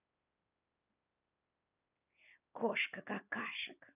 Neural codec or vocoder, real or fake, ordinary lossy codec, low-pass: none; real; none; 3.6 kHz